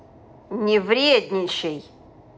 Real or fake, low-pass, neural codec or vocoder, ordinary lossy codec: real; none; none; none